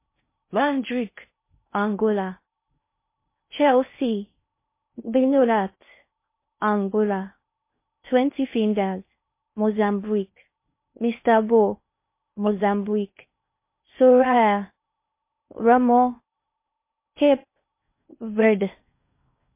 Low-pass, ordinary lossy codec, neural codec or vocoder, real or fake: 3.6 kHz; MP3, 24 kbps; codec, 16 kHz in and 24 kHz out, 0.6 kbps, FocalCodec, streaming, 2048 codes; fake